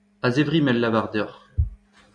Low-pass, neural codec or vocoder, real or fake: 9.9 kHz; none; real